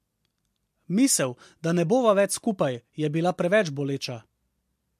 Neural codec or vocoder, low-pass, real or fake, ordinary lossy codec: none; 14.4 kHz; real; MP3, 64 kbps